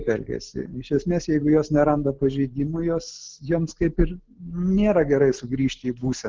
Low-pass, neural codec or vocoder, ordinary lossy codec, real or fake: 7.2 kHz; none; Opus, 16 kbps; real